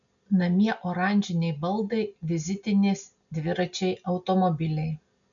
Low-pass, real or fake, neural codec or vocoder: 7.2 kHz; real; none